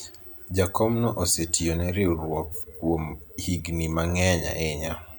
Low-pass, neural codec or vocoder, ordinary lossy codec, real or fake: none; none; none; real